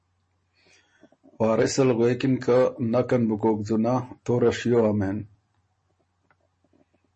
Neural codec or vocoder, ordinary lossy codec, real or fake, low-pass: none; MP3, 32 kbps; real; 10.8 kHz